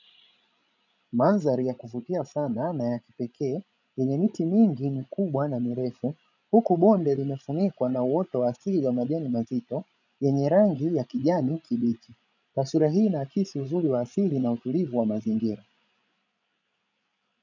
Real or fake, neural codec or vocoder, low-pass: fake; codec, 16 kHz, 16 kbps, FreqCodec, larger model; 7.2 kHz